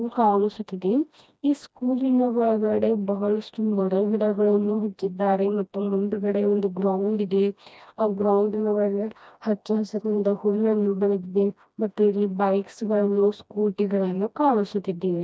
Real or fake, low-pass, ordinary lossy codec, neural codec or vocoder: fake; none; none; codec, 16 kHz, 1 kbps, FreqCodec, smaller model